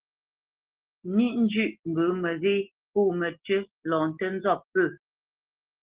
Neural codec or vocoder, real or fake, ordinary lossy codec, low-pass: none; real; Opus, 16 kbps; 3.6 kHz